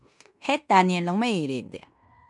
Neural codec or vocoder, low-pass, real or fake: codec, 16 kHz in and 24 kHz out, 0.9 kbps, LongCat-Audio-Codec, fine tuned four codebook decoder; 10.8 kHz; fake